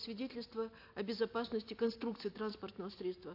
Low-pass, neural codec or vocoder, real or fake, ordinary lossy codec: 5.4 kHz; none; real; AAC, 32 kbps